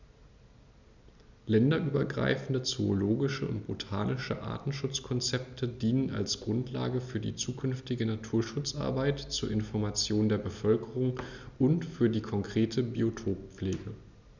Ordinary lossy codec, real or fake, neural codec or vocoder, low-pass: none; real; none; 7.2 kHz